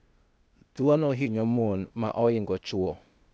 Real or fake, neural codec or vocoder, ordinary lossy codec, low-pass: fake; codec, 16 kHz, 0.8 kbps, ZipCodec; none; none